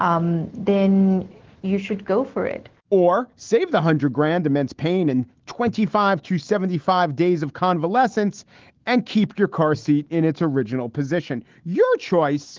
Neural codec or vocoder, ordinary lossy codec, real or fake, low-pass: autoencoder, 48 kHz, 128 numbers a frame, DAC-VAE, trained on Japanese speech; Opus, 16 kbps; fake; 7.2 kHz